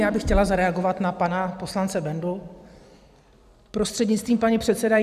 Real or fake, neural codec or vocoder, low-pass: fake; vocoder, 44.1 kHz, 128 mel bands every 256 samples, BigVGAN v2; 14.4 kHz